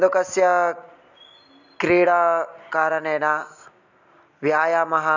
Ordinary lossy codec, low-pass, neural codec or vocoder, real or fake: none; 7.2 kHz; none; real